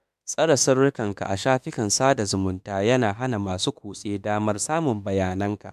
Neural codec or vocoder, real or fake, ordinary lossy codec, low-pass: autoencoder, 48 kHz, 32 numbers a frame, DAC-VAE, trained on Japanese speech; fake; MP3, 96 kbps; 14.4 kHz